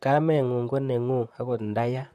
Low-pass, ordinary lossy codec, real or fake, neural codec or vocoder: 14.4 kHz; MP3, 64 kbps; fake; vocoder, 44.1 kHz, 128 mel bands, Pupu-Vocoder